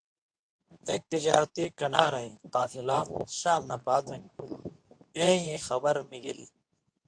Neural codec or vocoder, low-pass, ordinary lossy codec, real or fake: codec, 24 kHz, 0.9 kbps, WavTokenizer, medium speech release version 2; 9.9 kHz; AAC, 64 kbps; fake